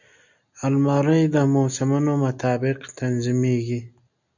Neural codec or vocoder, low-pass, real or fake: none; 7.2 kHz; real